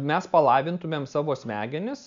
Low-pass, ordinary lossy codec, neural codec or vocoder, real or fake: 7.2 kHz; MP3, 64 kbps; none; real